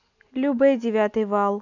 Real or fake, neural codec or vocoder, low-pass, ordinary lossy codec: real; none; 7.2 kHz; none